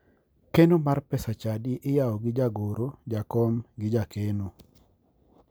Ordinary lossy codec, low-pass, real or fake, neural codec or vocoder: none; none; real; none